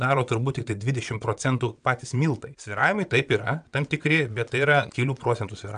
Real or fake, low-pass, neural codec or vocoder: fake; 9.9 kHz; vocoder, 22.05 kHz, 80 mel bands, WaveNeXt